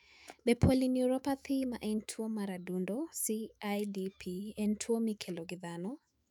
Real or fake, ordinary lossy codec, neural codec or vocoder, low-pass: fake; none; autoencoder, 48 kHz, 128 numbers a frame, DAC-VAE, trained on Japanese speech; 19.8 kHz